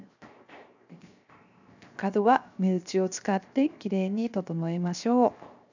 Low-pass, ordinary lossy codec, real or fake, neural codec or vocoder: 7.2 kHz; none; fake; codec, 16 kHz, 0.7 kbps, FocalCodec